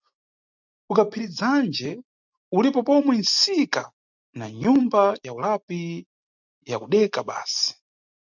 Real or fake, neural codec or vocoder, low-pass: real; none; 7.2 kHz